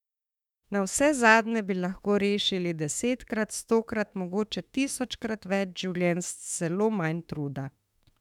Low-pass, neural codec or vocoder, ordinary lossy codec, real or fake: 19.8 kHz; autoencoder, 48 kHz, 32 numbers a frame, DAC-VAE, trained on Japanese speech; none; fake